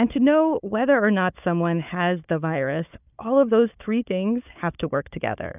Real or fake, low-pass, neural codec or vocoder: fake; 3.6 kHz; codec, 16 kHz, 4 kbps, FunCodec, trained on Chinese and English, 50 frames a second